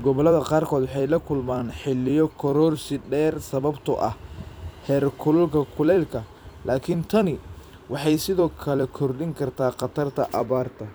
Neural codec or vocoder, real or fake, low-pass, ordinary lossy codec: vocoder, 44.1 kHz, 128 mel bands every 256 samples, BigVGAN v2; fake; none; none